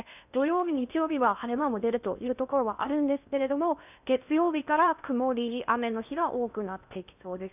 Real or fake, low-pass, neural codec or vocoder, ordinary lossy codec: fake; 3.6 kHz; codec, 16 kHz in and 24 kHz out, 0.8 kbps, FocalCodec, streaming, 65536 codes; none